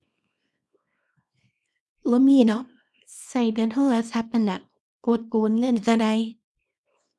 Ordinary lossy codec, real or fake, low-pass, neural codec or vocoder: none; fake; none; codec, 24 kHz, 0.9 kbps, WavTokenizer, small release